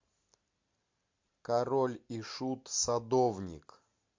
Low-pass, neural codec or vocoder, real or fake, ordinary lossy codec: 7.2 kHz; none; real; MP3, 48 kbps